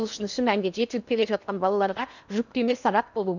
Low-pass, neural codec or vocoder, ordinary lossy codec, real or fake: 7.2 kHz; codec, 16 kHz in and 24 kHz out, 0.8 kbps, FocalCodec, streaming, 65536 codes; none; fake